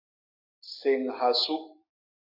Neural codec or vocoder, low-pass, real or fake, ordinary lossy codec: none; 5.4 kHz; real; AAC, 48 kbps